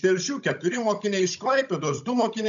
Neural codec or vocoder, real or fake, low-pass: codec, 16 kHz, 16 kbps, FunCodec, trained on Chinese and English, 50 frames a second; fake; 7.2 kHz